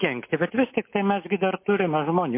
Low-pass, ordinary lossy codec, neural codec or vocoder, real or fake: 3.6 kHz; MP3, 24 kbps; none; real